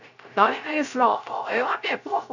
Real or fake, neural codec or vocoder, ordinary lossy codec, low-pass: fake; codec, 16 kHz, 0.3 kbps, FocalCodec; none; 7.2 kHz